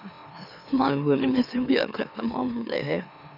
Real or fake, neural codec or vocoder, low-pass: fake; autoencoder, 44.1 kHz, a latent of 192 numbers a frame, MeloTTS; 5.4 kHz